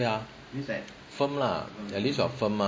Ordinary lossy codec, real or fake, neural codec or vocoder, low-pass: none; real; none; none